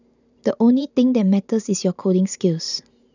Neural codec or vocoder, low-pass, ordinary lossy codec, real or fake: vocoder, 44.1 kHz, 128 mel bands every 512 samples, BigVGAN v2; 7.2 kHz; none; fake